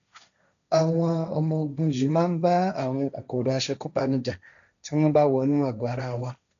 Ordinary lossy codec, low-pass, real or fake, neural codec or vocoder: none; 7.2 kHz; fake; codec, 16 kHz, 1.1 kbps, Voila-Tokenizer